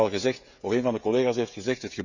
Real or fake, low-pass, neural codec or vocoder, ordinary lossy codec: fake; 7.2 kHz; codec, 16 kHz, 16 kbps, FreqCodec, smaller model; none